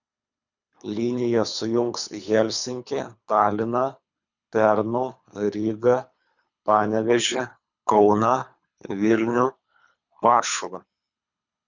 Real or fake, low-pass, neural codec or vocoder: fake; 7.2 kHz; codec, 24 kHz, 3 kbps, HILCodec